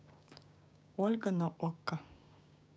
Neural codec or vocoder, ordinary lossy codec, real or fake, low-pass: codec, 16 kHz, 6 kbps, DAC; none; fake; none